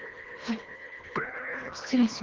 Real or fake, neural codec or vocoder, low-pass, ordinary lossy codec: fake; codec, 24 kHz, 1.5 kbps, HILCodec; 7.2 kHz; Opus, 16 kbps